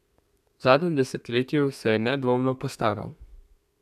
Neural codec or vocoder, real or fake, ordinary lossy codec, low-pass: codec, 32 kHz, 1.9 kbps, SNAC; fake; none; 14.4 kHz